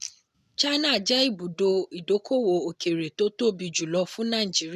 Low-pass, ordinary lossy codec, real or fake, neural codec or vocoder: 14.4 kHz; none; real; none